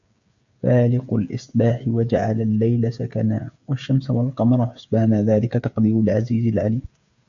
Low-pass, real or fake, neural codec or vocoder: 7.2 kHz; fake; codec, 16 kHz, 8 kbps, FreqCodec, smaller model